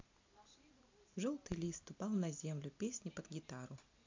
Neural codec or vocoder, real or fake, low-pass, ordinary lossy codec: none; real; 7.2 kHz; MP3, 64 kbps